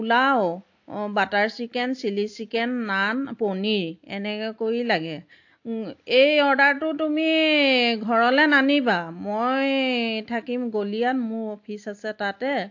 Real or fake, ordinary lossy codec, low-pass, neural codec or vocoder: real; AAC, 48 kbps; 7.2 kHz; none